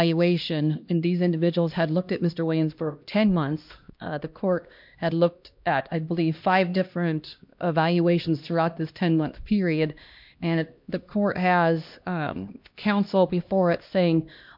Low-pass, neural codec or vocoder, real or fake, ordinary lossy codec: 5.4 kHz; codec, 16 kHz, 1 kbps, X-Codec, HuBERT features, trained on LibriSpeech; fake; MP3, 48 kbps